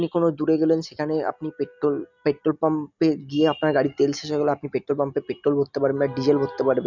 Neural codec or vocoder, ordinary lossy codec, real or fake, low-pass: none; none; real; 7.2 kHz